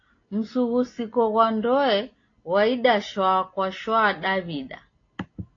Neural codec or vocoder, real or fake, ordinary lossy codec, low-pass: none; real; AAC, 32 kbps; 7.2 kHz